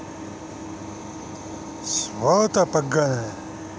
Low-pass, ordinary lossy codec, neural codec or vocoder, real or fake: none; none; none; real